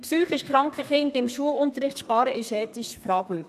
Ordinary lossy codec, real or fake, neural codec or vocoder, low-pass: none; fake; codec, 32 kHz, 1.9 kbps, SNAC; 14.4 kHz